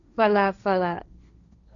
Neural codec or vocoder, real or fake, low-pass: codec, 16 kHz, 1.1 kbps, Voila-Tokenizer; fake; 7.2 kHz